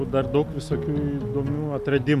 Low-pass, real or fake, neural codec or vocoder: 14.4 kHz; real; none